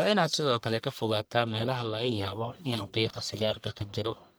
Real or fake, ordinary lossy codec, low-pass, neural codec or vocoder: fake; none; none; codec, 44.1 kHz, 1.7 kbps, Pupu-Codec